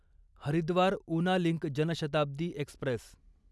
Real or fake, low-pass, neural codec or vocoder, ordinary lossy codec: real; none; none; none